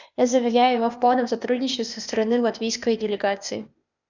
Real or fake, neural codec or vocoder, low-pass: fake; codec, 16 kHz, 0.8 kbps, ZipCodec; 7.2 kHz